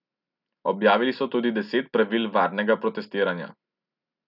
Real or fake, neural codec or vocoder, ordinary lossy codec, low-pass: real; none; none; 5.4 kHz